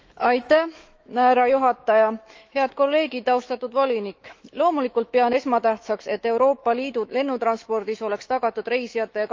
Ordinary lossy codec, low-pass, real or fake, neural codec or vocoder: Opus, 24 kbps; 7.2 kHz; fake; autoencoder, 48 kHz, 128 numbers a frame, DAC-VAE, trained on Japanese speech